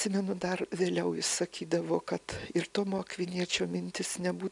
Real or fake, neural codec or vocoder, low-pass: real; none; 10.8 kHz